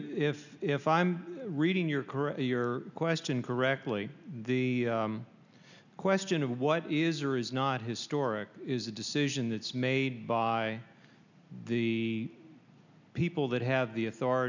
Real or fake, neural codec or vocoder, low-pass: real; none; 7.2 kHz